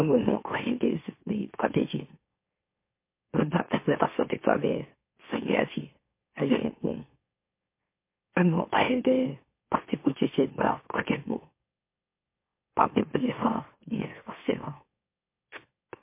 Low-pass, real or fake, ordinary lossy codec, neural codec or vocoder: 3.6 kHz; fake; MP3, 24 kbps; autoencoder, 44.1 kHz, a latent of 192 numbers a frame, MeloTTS